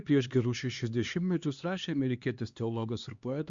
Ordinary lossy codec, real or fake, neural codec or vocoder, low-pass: Opus, 64 kbps; fake; codec, 16 kHz, 2 kbps, X-Codec, HuBERT features, trained on LibriSpeech; 7.2 kHz